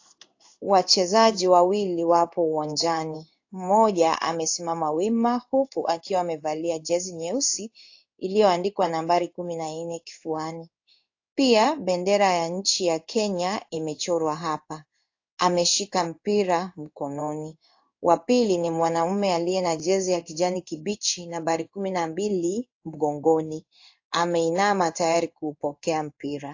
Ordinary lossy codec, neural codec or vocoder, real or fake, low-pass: AAC, 48 kbps; codec, 16 kHz in and 24 kHz out, 1 kbps, XY-Tokenizer; fake; 7.2 kHz